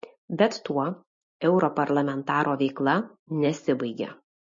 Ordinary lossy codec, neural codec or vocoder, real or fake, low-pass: MP3, 32 kbps; none; real; 7.2 kHz